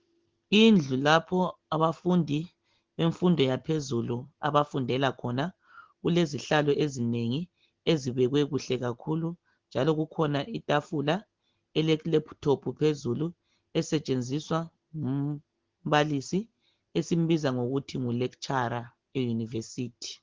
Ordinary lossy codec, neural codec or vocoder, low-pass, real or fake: Opus, 16 kbps; none; 7.2 kHz; real